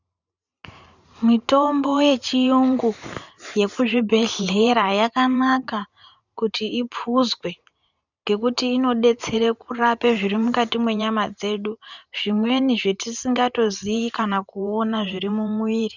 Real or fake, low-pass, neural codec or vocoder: fake; 7.2 kHz; vocoder, 44.1 kHz, 128 mel bands every 512 samples, BigVGAN v2